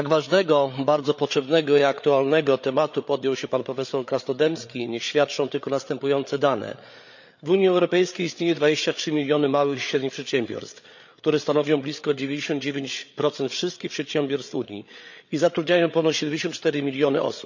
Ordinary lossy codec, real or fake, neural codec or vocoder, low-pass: none; fake; codec, 16 kHz, 8 kbps, FreqCodec, larger model; 7.2 kHz